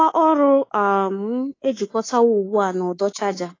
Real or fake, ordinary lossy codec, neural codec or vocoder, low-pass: fake; AAC, 32 kbps; codec, 24 kHz, 3.1 kbps, DualCodec; 7.2 kHz